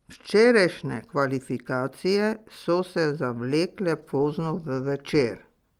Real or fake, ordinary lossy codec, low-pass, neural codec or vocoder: real; Opus, 32 kbps; 19.8 kHz; none